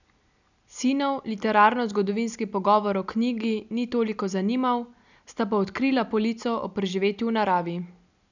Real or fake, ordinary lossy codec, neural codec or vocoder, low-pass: real; none; none; 7.2 kHz